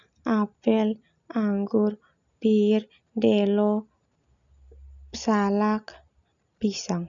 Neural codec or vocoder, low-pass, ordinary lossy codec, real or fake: none; 7.2 kHz; none; real